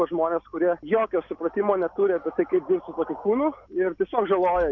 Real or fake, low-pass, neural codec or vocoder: real; 7.2 kHz; none